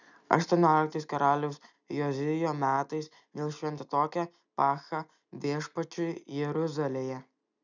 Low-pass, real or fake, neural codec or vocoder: 7.2 kHz; real; none